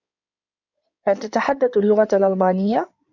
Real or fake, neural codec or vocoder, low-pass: fake; codec, 16 kHz in and 24 kHz out, 2.2 kbps, FireRedTTS-2 codec; 7.2 kHz